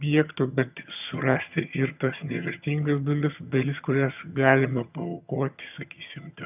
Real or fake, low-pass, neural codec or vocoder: fake; 3.6 kHz; vocoder, 22.05 kHz, 80 mel bands, HiFi-GAN